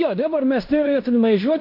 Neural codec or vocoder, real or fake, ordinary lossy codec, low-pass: codec, 16 kHz in and 24 kHz out, 0.9 kbps, LongCat-Audio-Codec, fine tuned four codebook decoder; fake; MP3, 32 kbps; 5.4 kHz